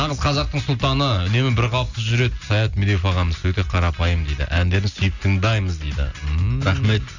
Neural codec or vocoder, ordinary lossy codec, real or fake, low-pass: none; none; real; 7.2 kHz